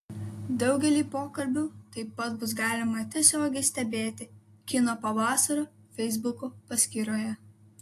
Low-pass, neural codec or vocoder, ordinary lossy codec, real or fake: 14.4 kHz; none; AAC, 64 kbps; real